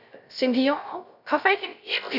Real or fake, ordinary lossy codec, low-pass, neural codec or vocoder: fake; none; 5.4 kHz; codec, 16 kHz, 0.3 kbps, FocalCodec